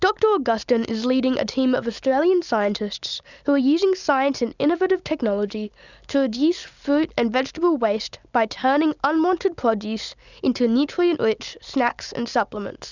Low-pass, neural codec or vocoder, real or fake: 7.2 kHz; none; real